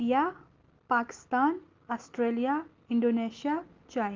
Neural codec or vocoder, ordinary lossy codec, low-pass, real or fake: none; Opus, 32 kbps; 7.2 kHz; real